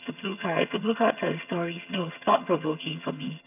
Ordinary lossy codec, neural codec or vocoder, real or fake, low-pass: none; vocoder, 22.05 kHz, 80 mel bands, HiFi-GAN; fake; 3.6 kHz